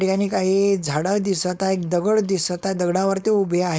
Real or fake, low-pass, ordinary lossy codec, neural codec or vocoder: fake; none; none; codec, 16 kHz, 4.8 kbps, FACodec